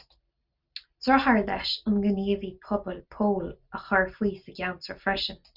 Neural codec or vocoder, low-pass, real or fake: none; 5.4 kHz; real